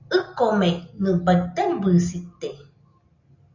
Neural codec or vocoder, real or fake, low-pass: none; real; 7.2 kHz